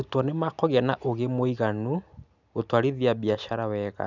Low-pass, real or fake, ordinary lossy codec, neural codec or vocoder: 7.2 kHz; real; none; none